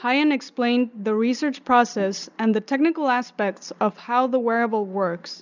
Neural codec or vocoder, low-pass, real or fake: none; 7.2 kHz; real